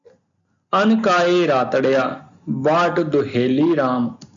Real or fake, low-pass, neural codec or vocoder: real; 7.2 kHz; none